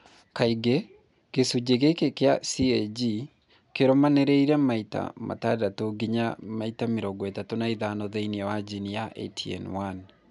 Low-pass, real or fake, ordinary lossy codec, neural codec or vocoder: 10.8 kHz; real; MP3, 96 kbps; none